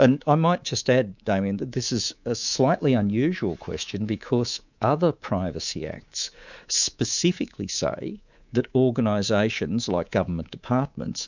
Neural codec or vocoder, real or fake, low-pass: codec, 24 kHz, 3.1 kbps, DualCodec; fake; 7.2 kHz